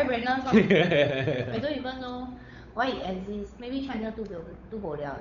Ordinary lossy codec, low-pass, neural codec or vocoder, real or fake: none; 7.2 kHz; codec, 16 kHz, 8 kbps, FunCodec, trained on Chinese and English, 25 frames a second; fake